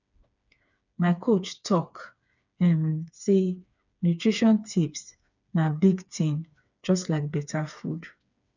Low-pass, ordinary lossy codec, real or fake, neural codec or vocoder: 7.2 kHz; none; fake; codec, 16 kHz, 4 kbps, FreqCodec, smaller model